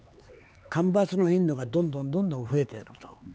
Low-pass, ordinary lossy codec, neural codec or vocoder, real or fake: none; none; codec, 16 kHz, 4 kbps, X-Codec, HuBERT features, trained on LibriSpeech; fake